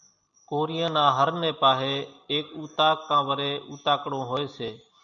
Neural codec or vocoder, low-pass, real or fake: none; 7.2 kHz; real